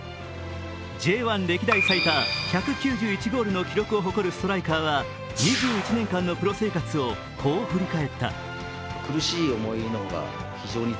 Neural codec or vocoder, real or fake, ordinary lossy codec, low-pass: none; real; none; none